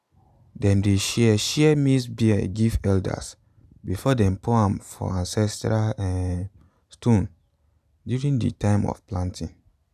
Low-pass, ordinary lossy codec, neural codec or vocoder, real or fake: 14.4 kHz; none; none; real